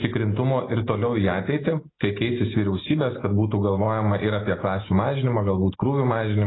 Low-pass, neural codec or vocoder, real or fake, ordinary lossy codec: 7.2 kHz; none; real; AAC, 16 kbps